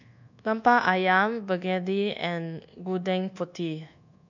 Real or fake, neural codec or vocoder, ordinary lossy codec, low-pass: fake; codec, 24 kHz, 1.2 kbps, DualCodec; none; 7.2 kHz